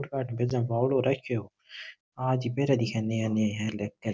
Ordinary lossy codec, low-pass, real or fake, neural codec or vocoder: none; none; real; none